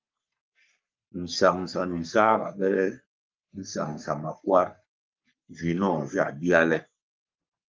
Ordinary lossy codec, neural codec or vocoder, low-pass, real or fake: Opus, 24 kbps; codec, 44.1 kHz, 3.4 kbps, Pupu-Codec; 7.2 kHz; fake